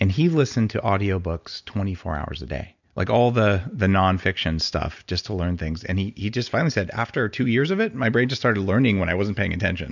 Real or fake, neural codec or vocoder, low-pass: real; none; 7.2 kHz